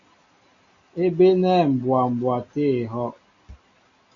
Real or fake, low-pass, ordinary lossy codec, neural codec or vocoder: real; 7.2 kHz; AAC, 64 kbps; none